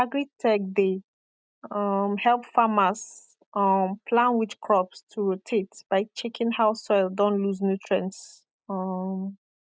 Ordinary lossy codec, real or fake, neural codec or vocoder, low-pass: none; real; none; none